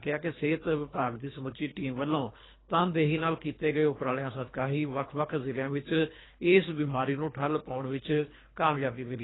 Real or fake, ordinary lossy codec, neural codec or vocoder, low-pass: fake; AAC, 16 kbps; codec, 24 kHz, 3 kbps, HILCodec; 7.2 kHz